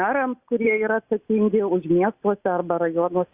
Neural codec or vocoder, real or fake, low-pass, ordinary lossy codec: none; real; 3.6 kHz; Opus, 64 kbps